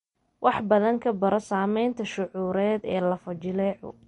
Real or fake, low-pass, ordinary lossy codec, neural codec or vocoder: real; 19.8 kHz; MP3, 48 kbps; none